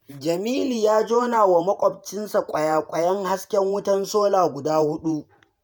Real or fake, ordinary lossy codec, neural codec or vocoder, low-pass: fake; none; vocoder, 48 kHz, 128 mel bands, Vocos; none